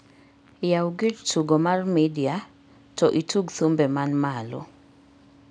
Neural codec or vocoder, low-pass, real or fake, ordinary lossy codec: none; 9.9 kHz; real; none